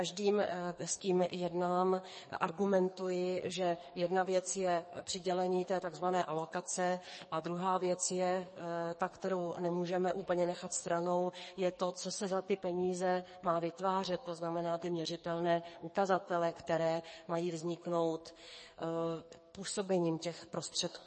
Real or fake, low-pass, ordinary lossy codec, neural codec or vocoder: fake; 10.8 kHz; MP3, 32 kbps; codec, 44.1 kHz, 2.6 kbps, SNAC